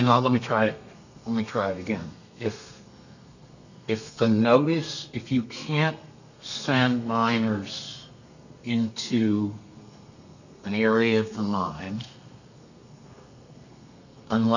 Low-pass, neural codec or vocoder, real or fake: 7.2 kHz; codec, 32 kHz, 1.9 kbps, SNAC; fake